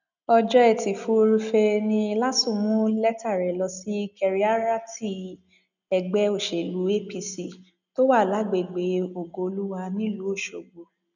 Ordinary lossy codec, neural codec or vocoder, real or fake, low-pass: none; none; real; 7.2 kHz